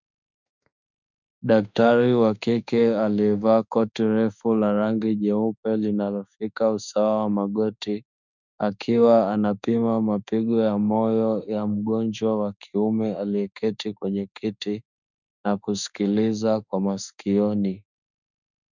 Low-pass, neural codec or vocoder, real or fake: 7.2 kHz; autoencoder, 48 kHz, 32 numbers a frame, DAC-VAE, trained on Japanese speech; fake